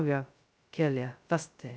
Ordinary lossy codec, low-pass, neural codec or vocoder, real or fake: none; none; codec, 16 kHz, 0.2 kbps, FocalCodec; fake